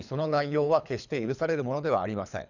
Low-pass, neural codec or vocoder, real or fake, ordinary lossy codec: 7.2 kHz; codec, 24 kHz, 3 kbps, HILCodec; fake; none